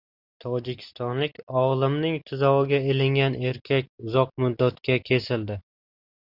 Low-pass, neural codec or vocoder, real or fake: 5.4 kHz; none; real